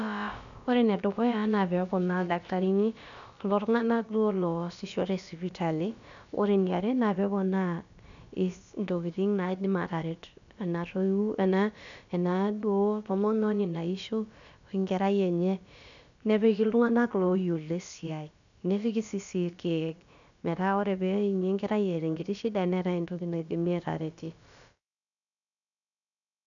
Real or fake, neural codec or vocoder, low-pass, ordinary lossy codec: fake; codec, 16 kHz, about 1 kbps, DyCAST, with the encoder's durations; 7.2 kHz; none